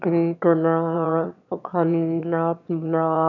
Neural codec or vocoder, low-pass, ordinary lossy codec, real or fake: autoencoder, 22.05 kHz, a latent of 192 numbers a frame, VITS, trained on one speaker; 7.2 kHz; none; fake